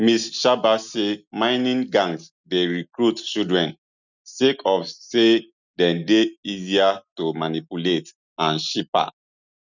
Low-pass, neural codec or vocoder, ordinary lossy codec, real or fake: 7.2 kHz; none; none; real